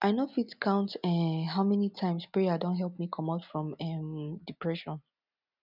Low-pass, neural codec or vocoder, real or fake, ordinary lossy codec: 5.4 kHz; none; real; none